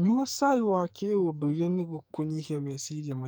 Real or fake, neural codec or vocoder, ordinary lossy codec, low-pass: fake; codec, 44.1 kHz, 2.6 kbps, SNAC; none; none